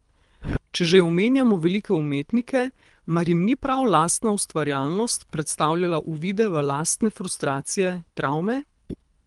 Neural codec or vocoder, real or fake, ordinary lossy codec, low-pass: codec, 24 kHz, 3 kbps, HILCodec; fake; Opus, 32 kbps; 10.8 kHz